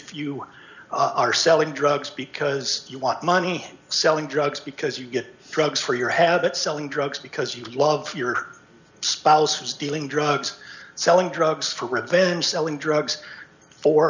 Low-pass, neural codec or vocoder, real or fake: 7.2 kHz; none; real